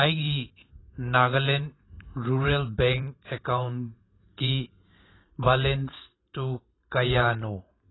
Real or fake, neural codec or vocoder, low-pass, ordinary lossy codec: fake; vocoder, 44.1 kHz, 128 mel bands every 512 samples, BigVGAN v2; 7.2 kHz; AAC, 16 kbps